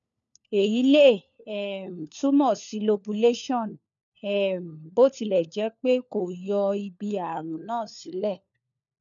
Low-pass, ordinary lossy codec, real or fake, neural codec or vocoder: 7.2 kHz; none; fake; codec, 16 kHz, 4 kbps, FunCodec, trained on LibriTTS, 50 frames a second